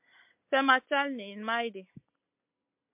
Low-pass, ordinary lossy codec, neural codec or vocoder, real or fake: 3.6 kHz; MP3, 32 kbps; none; real